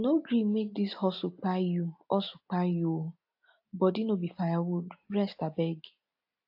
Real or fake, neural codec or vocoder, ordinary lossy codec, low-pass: real; none; AAC, 48 kbps; 5.4 kHz